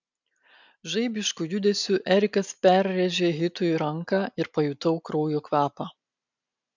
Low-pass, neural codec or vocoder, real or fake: 7.2 kHz; none; real